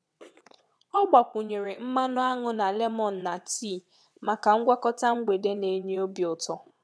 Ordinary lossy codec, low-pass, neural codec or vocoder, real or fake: none; none; vocoder, 22.05 kHz, 80 mel bands, WaveNeXt; fake